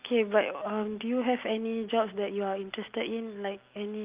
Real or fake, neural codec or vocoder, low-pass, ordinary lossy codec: real; none; 3.6 kHz; Opus, 32 kbps